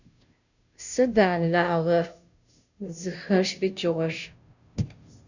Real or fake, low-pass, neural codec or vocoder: fake; 7.2 kHz; codec, 16 kHz, 0.5 kbps, FunCodec, trained on Chinese and English, 25 frames a second